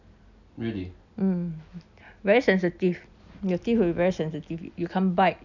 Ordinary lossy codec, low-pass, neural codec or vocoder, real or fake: none; 7.2 kHz; none; real